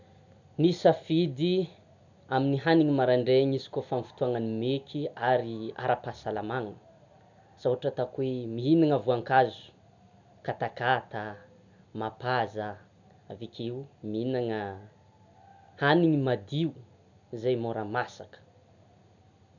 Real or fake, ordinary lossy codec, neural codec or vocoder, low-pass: real; none; none; 7.2 kHz